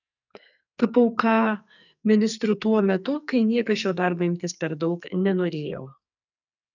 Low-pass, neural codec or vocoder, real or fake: 7.2 kHz; codec, 44.1 kHz, 2.6 kbps, SNAC; fake